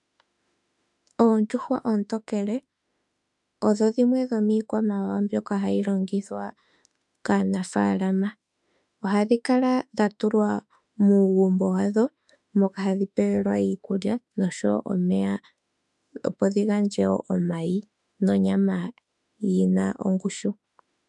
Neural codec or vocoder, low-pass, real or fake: autoencoder, 48 kHz, 32 numbers a frame, DAC-VAE, trained on Japanese speech; 10.8 kHz; fake